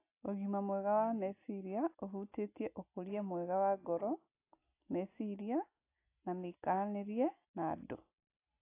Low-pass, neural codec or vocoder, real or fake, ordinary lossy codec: 3.6 kHz; none; real; AAC, 24 kbps